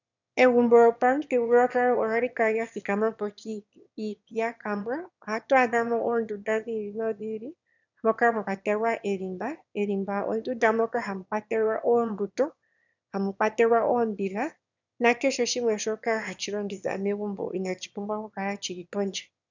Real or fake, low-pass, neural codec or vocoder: fake; 7.2 kHz; autoencoder, 22.05 kHz, a latent of 192 numbers a frame, VITS, trained on one speaker